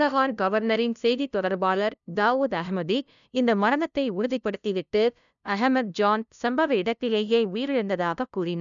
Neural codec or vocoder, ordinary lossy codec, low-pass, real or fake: codec, 16 kHz, 0.5 kbps, FunCodec, trained on LibriTTS, 25 frames a second; none; 7.2 kHz; fake